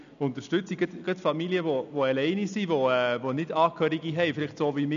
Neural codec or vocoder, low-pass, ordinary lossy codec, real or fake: none; 7.2 kHz; none; real